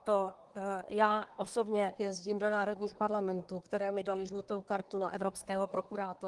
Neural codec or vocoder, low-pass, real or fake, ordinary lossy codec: codec, 24 kHz, 1 kbps, SNAC; 10.8 kHz; fake; Opus, 24 kbps